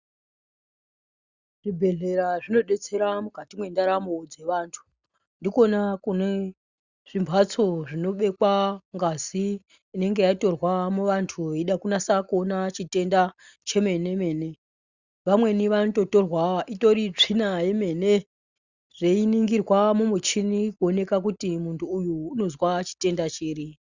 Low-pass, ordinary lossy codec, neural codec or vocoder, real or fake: 7.2 kHz; Opus, 64 kbps; none; real